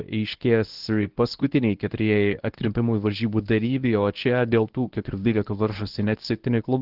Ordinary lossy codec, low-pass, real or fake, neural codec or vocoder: Opus, 16 kbps; 5.4 kHz; fake; codec, 24 kHz, 0.9 kbps, WavTokenizer, medium speech release version 1